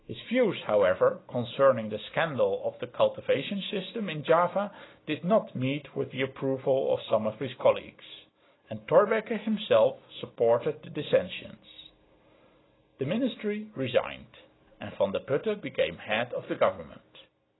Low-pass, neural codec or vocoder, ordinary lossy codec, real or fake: 7.2 kHz; none; AAC, 16 kbps; real